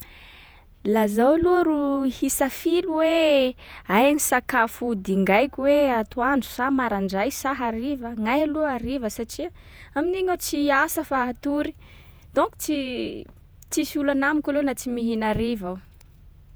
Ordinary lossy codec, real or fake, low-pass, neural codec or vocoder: none; fake; none; vocoder, 48 kHz, 128 mel bands, Vocos